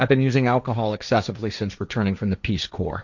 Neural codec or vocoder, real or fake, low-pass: codec, 16 kHz, 1.1 kbps, Voila-Tokenizer; fake; 7.2 kHz